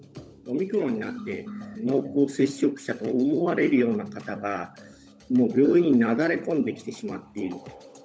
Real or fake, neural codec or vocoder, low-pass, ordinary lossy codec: fake; codec, 16 kHz, 16 kbps, FunCodec, trained on LibriTTS, 50 frames a second; none; none